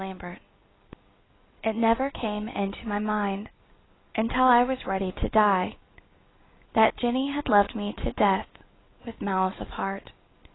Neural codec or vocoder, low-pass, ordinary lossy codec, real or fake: none; 7.2 kHz; AAC, 16 kbps; real